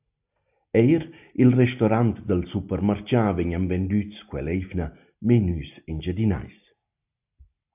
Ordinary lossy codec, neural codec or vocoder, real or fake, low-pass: AAC, 32 kbps; none; real; 3.6 kHz